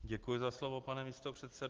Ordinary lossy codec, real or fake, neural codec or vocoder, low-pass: Opus, 16 kbps; real; none; 7.2 kHz